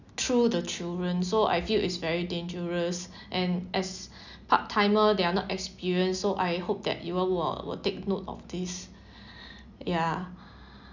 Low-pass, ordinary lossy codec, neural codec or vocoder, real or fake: 7.2 kHz; none; none; real